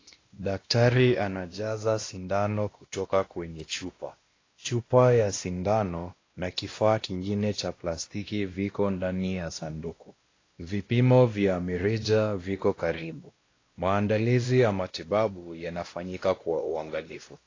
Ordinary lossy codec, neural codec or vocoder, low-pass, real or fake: AAC, 32 kbps; codec, 16 kHz, 1 kbps, X-Codec, WavLM features, trained on Multilingual LibriSpeech; 7.2 kHz; fake